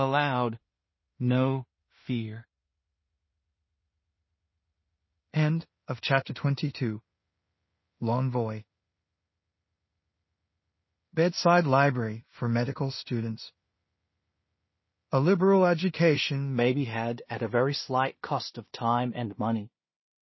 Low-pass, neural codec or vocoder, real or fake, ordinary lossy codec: 7.2 kHz; codec, 16 kHz in and 24 kHz out, 0.4 kbps, LongCat-Audio-Codec, two codebook decoder; fake; MP3, 24 kbps